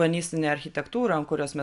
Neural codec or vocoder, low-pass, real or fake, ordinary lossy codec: none; 10.8 kHz; real; AAC, 96 kbps